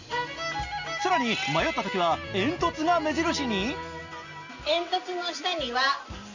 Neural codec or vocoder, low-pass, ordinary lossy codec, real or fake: none; 7.2 kHz; Opus, 64 kbps; real